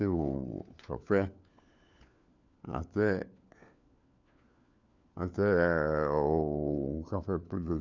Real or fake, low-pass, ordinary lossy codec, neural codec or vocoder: fake; 7.2 kHz; none; codec, 16 kHz, 4 kbps, FunCodec, trained on LibriTTS, 50 frames a second